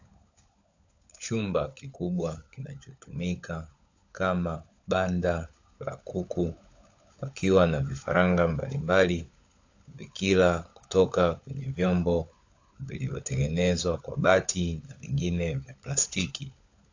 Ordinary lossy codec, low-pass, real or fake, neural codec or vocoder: AAC, 48 kbps; 7.2 kHz; fake; codec, 16 kHz, 16 kbps, FunCodec, trained on LibriTTS, 50 frames a second